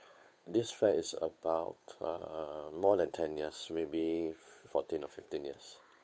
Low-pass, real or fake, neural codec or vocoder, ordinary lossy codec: none; fake; codec, 16 kHz, 8 kbps, FunCodec, trained on Chinese and English, 25 frames a second; none